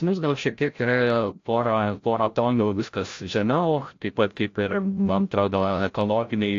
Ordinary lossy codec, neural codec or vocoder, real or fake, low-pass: AAC, 48 kbps; codec, 16 kHz, 0.5 kbps, FreqCodec, larger model; fake; 7.2 kHz